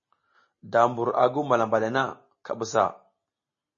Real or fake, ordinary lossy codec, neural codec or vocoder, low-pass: real; MP3, 32 kbps; none; 7.2 kHz